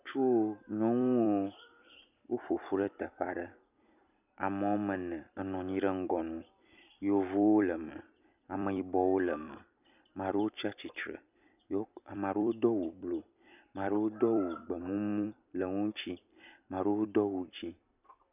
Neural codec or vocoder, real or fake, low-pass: vocoder, 44.1 kHz, 128 mel bands every 256 samples, BigVGAN v2; fake; 3.6 kHz